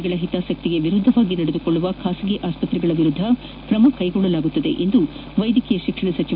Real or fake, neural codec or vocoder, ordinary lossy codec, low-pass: real; none; none; 5.4 kHz